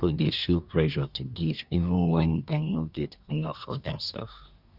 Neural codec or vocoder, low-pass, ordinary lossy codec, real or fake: codec, 24 kHz, 1 kbps, SNAC; 5.4 kHz; none; fake